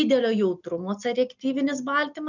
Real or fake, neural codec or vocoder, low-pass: real; none; 7.2 kHz